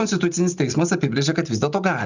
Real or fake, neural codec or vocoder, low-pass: real; none; 7.2 kHz